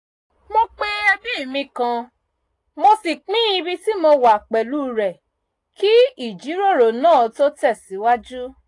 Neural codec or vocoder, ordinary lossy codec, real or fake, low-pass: none; AAC, 48 kbps; real; 10.8 kHz